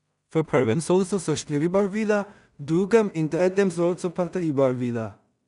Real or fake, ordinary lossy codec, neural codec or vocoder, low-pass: fake; none; codec, 16 kHz in and 24 kHz out, 0.4 kbps, LongCat-Audio-Codec, two codebook decoder; 10.8 kHz